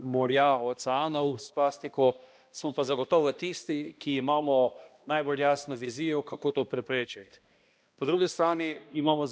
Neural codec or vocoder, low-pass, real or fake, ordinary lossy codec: codec, 16 kHz, 1 kbps, X-Codec, HuBERT features, trained on balanced general audio; none; fake; none